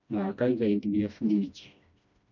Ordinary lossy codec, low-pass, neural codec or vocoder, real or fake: none; 7.2 kHz; codec, 16 kHz, 1 kbps, FreqCodec, smaller model; fake